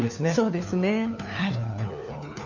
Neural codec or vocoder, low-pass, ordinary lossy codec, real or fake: codec, 16 kHz, 4 kbps, FunCodec, trained on LibriTTS, 50 frames a second; 7.2 kHz; none; fake